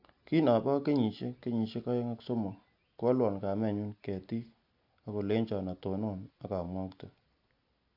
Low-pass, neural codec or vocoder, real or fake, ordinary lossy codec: 5.4 kHz; none; real; AAC, 48 kbps